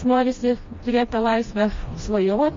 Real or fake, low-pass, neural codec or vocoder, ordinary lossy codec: fake; 7.2 kHz; codec, 16 kHz, 1 kbps, FreqCodec, smaller model; MP3, 32 kbps